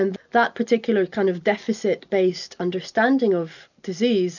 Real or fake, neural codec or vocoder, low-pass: real; none; 7.2 kHz